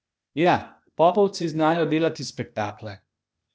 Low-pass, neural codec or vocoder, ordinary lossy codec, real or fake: none; codec, 16 kHz, 0.8 kbps, ZipCodec; none; fake